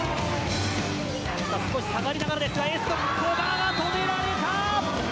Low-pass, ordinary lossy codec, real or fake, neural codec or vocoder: none; none; real; none